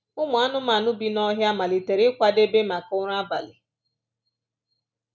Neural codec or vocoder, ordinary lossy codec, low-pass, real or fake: none; none; none; real